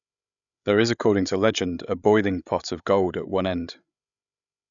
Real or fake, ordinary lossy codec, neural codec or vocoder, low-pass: fake; none; codec, 16 kHz, 8 kbps, FreqCodec, larger model; 7.2 kHz